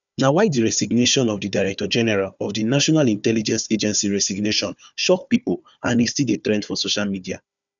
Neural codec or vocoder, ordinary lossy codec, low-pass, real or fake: codec, 16 kHz, 4 kbps, FunCodec, trained on Chinese and English, 50 frames a second; none; 7.2 kHz; fake